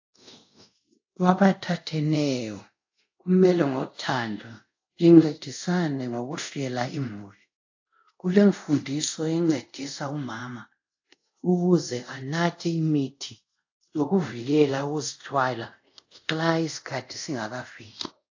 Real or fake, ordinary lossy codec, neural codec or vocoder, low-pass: fake; AAC, 48 kbps; codec, 24 kHz, 0.5 kbps, DualCodec; 7.2 kHz